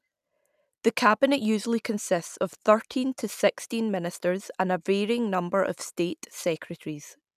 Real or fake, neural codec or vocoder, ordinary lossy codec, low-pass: real; none; none; 14.4 kHz